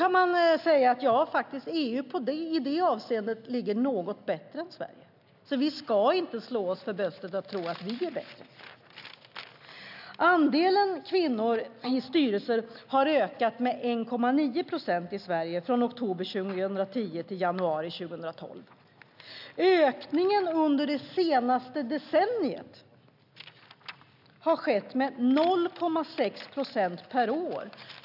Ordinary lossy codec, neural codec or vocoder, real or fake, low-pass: none; none; real; 5.4 kHz